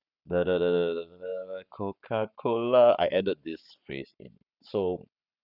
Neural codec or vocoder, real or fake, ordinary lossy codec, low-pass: codec, 44.1 kHz, 7.8 kbps, Pupu-Codec; fake; none; 5.4 kHz